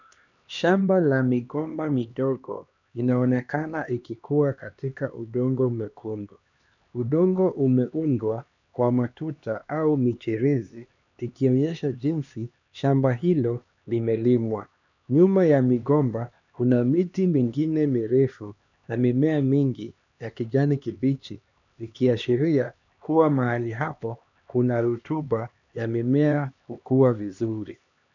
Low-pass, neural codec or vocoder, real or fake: 7.2 kHz; codec, 16 kHz, 2 kbps, X-Codec, HuBERT features, trained on LibriSpeech; fake